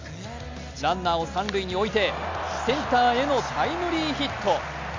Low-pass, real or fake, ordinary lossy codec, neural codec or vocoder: 7.2 kHz; real; MP3, 48 kbps; none